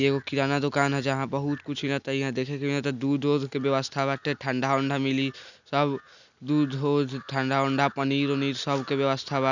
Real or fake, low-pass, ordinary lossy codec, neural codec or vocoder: real; 7.2 kHz; none; none